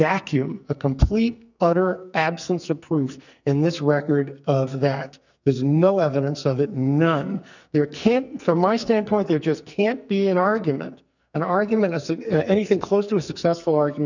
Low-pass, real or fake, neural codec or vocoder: 7.2 kHz; fake; codec, 44.1 kHz, 2.6 kbps, SNAC